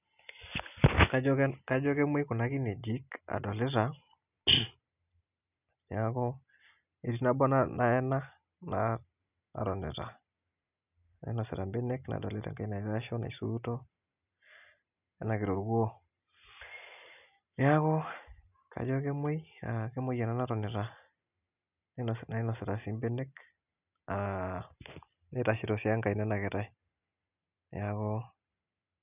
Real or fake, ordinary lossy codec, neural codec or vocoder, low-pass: real; none; none; 3.6 kHz